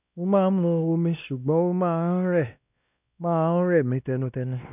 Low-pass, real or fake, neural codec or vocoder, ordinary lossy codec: 3.6 kHz; fake; codec, 16 kHz, 1 kbps, X-Codec, WavLM features, trained on Multilingual LibriSpeech; none